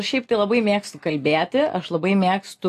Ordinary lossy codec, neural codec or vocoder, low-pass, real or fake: AAC, 64 kbps; none; 14.4 kHz; real